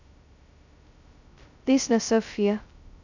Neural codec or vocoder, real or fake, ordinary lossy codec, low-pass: codec, 16 kHz, 0.2 kbps, FocalCodec; fake; none; 7.2 kHz